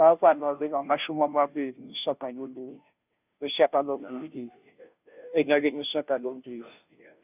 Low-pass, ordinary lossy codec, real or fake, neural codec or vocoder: 3.6 kHz; none; fake; codec, 16 kHz, 0.5 kbps, FunCodec, trained on Chinese and English, 25 frames a second